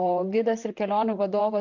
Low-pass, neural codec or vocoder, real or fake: 7.2 kHz; vocoder, 44.1 kHz, 128 mel bands, Pupu-Vocoder; fake